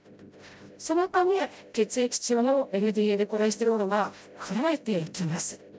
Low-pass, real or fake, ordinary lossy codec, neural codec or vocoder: none; fake; none; codec, 16 kHz, 0.5 kbps, FreqCodec, smaller model